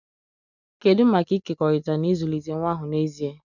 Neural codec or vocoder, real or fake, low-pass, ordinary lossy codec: none; real; 7.2 kHz; none